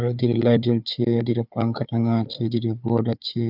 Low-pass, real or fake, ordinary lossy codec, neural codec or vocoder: 5.4 kHz; fake; none; codec, 16 kHz, 4 kbps, FunCodec, trained on Chinese and English, 50 frames a second